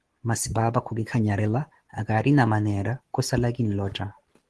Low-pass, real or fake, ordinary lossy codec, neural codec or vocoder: 10.8 kHz; real; Opus, 16 kbps; none